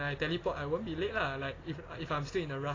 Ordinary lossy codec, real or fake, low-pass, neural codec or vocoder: AAC, 32 kbps; real; 7.2 kHz; none